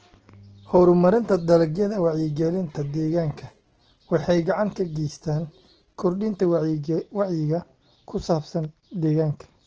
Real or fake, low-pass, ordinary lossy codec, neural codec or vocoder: real; 7.2 kHz; Opus, 16 kbps; none